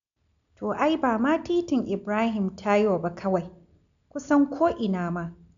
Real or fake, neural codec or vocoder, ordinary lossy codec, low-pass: real; none; none; 7.2 kHz